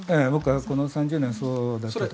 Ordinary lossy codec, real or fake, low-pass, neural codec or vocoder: none; real; none; none